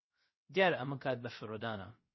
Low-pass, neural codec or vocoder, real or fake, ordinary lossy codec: 7.2 kHz; codec, 16 kHz, 0.3 kbps, FocalCodec; fake; MP3, 24 kbps